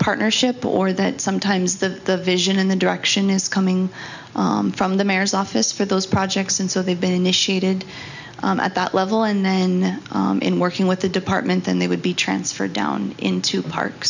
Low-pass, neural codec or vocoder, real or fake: 7.2 kHz; none; real